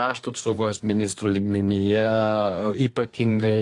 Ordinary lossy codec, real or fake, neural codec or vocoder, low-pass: AAC, 48 kbps; fake; codec, 24 kHz, 1 kbps, SNAC; 10.8 kHz